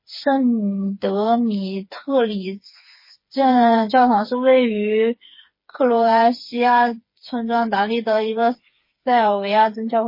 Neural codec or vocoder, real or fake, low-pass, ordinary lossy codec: codec, 16 kHz, 4 kbps, FreqCodec, smaller model; fake; 5.4 kHz; MP3, 24 kbps